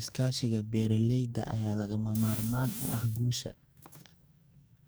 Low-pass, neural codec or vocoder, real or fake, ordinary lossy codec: none; codec, 44.1 kHz, 2.6 kbps, DAC; fake; none